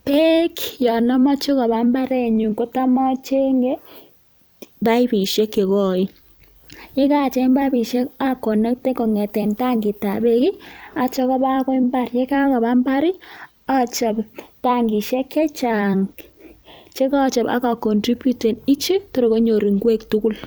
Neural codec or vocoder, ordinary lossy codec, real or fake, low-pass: vocoder, 44.1 kHz, 128 mel bands every 256 samples, BigVGAN v2; none; fake; none